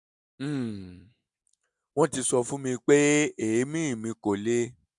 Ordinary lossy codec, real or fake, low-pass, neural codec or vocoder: MP3, 96 kbps; real; 10.8 kHz; none